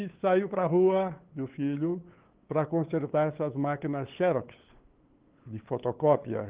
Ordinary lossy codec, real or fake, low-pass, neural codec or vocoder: Opus, 16 kbps; fake; 3.6 kHz; codec, 16 kHz, 8 kbps, FunCodec, trained on LibriTTS, 25 frames a second